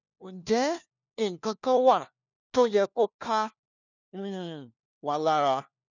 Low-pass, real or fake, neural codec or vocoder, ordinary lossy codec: 7.2 kHz; fake; codec, 16 kHz, 1 kbps, FunCodec, trained on LibriTTS, 50 frames a second; none